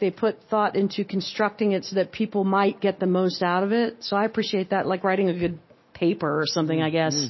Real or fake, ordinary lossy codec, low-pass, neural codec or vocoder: real; MP3, 24 kbps; 7.2 kHz; none